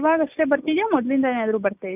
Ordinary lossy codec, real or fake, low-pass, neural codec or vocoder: none; real; 3.6 kHz; none